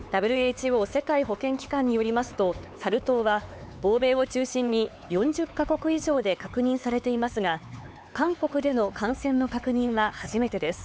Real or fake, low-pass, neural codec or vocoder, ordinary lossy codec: fake; none; codec, 16 kHz, 4 kbps, X-Codec, HuBERT features, trained on LibriSpeech; none